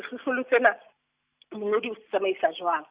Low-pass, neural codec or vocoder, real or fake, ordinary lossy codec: 3.6 kHz; none; real; Opus, 64 kbps